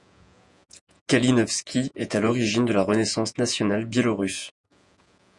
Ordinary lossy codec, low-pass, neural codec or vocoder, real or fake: Opus, 64 kbps; 10.8 kHz; vocoder, 48 kHz, 128 mel bands, Vocos; fake